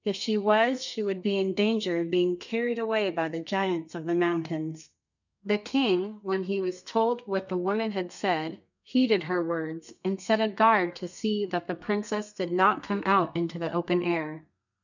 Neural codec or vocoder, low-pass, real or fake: codec, 32 kHz, 1.9 kbps, SNAC; 7.2 kHz; fake